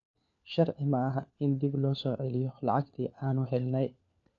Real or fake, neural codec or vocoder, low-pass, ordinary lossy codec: fake; codec, 16 kHz, 4 kbps, FunCodec, trained on LibriTTS, 50 frames a second; 7.2 kHz; none